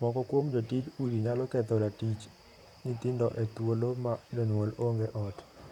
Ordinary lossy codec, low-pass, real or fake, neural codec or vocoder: none; 19.8 kHz; fake; vocoder, 44.1 kHz, 128 mel bands, Pupu-Vocoder